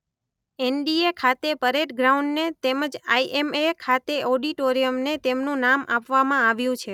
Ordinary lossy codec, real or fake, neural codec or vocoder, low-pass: none; real; none; 19.8 kHz